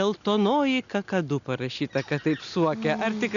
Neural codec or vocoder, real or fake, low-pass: none; real; 7.2 kHz